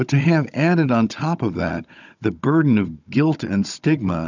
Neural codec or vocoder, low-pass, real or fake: codec, 16 kHz, 16 kbps, FunCodec, trained on Chinese and English, 50 frames a second; 7.2 kHz; fake